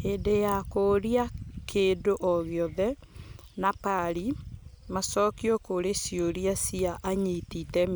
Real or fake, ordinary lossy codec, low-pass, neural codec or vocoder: real; none; none; none